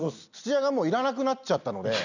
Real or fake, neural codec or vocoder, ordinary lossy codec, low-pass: real; none; none; 7.2 kHz